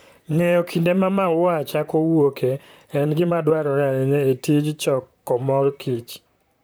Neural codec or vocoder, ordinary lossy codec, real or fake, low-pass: vocoder, 44.1 kHz, 128 mel bands, Pupu-Vocoder; none; fake; none